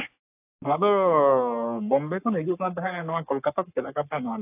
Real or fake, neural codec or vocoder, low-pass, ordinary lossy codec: fake; codec, 44.1 kHz, 3.4 kbps, Pupu-Codec; 3.6 kHz; AAC, 32 kbps